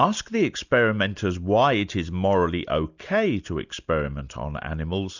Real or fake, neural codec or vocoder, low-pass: real; none; 7.2 kHz